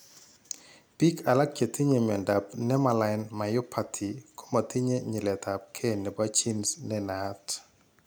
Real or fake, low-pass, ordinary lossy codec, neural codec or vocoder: real; none; none; none